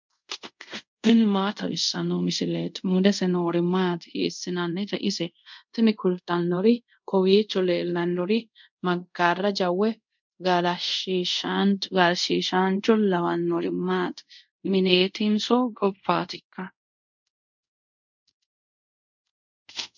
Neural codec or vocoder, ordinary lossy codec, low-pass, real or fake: codec, 24 kHz, 0.5 kbps, DualCodec; MP3, 64 kbps; 7.2 kHz; fake